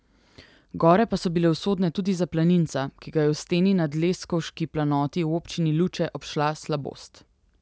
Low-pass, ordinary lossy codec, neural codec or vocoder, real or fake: none; none; none; real